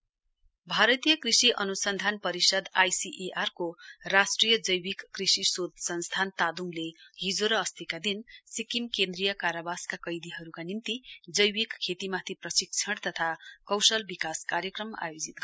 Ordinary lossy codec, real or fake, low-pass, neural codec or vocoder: none; real; none; none